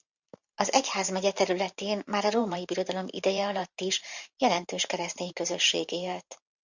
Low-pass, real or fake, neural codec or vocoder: 7.2 kHz; fake; vocoder, 44.1 kHz, 128 mel bands every 512 samples, BigVGAN v2